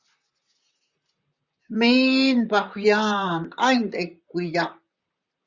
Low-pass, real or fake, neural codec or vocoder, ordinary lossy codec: 7.2 kHz; fake; vocoder, 44.1 kHz, 128 mel bands, Pupu-Vocoder; Opus, 64 kbps